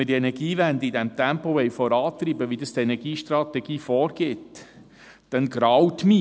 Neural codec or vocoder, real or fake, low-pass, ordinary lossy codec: none; real; none; none